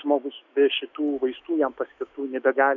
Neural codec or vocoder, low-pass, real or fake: autoencoder, 48 kHz, 128 numbers a frame, DAC-VAE, trained on Japanese speech; 7.2 kHz; fake